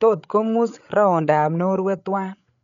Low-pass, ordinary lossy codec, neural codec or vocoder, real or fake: 7.2 kHz; none; codec, 16 kHz, 8 kbps, FreqCodec, larger model; fake